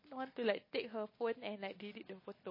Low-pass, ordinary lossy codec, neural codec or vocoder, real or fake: 5.4 kHz; MP3, 24 kbps; none; real